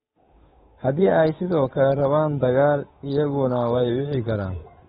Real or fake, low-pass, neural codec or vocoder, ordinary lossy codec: fake; 7.2 kHz; codec, 16 kHz, 8 kbps, FunCodec, trained on Chinese and English, 25 frames a second; AAC, 16 kbps